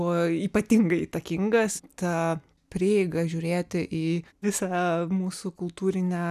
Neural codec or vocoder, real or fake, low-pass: none; real; 14.4 kHz